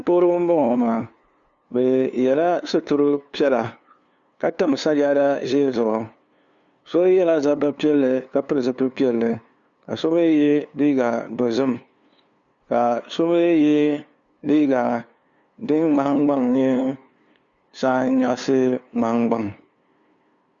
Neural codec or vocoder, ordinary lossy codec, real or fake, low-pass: codec, 16 kHz, 2 kbps, FunCodec, trained on LibriTTS, 25 frames a second; Opus, 64 kbps; fake; 7.2 kHz